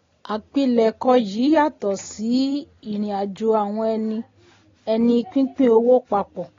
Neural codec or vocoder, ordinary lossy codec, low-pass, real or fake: none; AAC, 32 kbps; 7.2 kHz; real